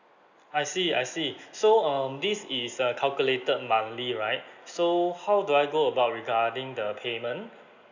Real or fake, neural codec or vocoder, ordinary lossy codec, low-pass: real; none; none; 7.2 kHz